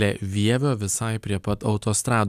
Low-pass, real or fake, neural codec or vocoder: 14.4 kHz; real; none